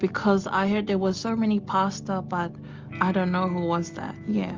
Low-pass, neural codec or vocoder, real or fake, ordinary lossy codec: 7.2 kHz; none; real; Opus, 32 kbps